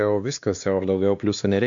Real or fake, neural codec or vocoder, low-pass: fake; codec, 16 kHz, 2 kbps, X-Codec, WavLM features, trained on Multilingual LibriSpeech; 7.2 kHz